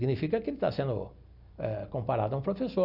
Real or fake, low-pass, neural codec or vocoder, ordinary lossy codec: real; 5.4 kHz; none; none